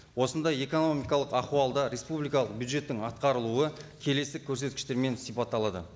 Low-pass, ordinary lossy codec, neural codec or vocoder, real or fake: none; none; none; real